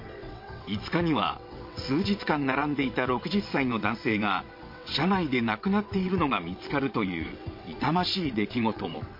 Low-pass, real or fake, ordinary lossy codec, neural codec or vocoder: 5.4 kHz; fake; MP3, 32 kbps; vocoder, 22.05 kHz, 80 mel bands, WaveNeXt